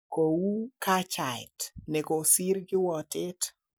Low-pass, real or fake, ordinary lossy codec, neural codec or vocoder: none; real; none; none